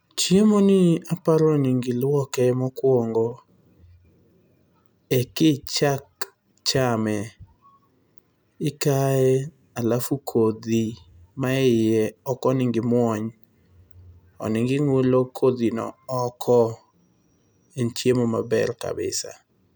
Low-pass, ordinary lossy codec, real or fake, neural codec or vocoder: none; none; real; none